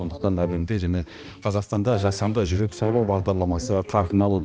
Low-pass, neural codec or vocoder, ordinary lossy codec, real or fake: none; codec, 16 kHz, 1 kbps, X-Codec, HuBERT features, trained on balanced general audio; none; fake